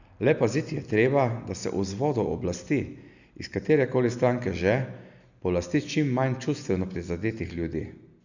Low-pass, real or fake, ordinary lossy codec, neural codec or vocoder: 7.2 kHz; real; none; none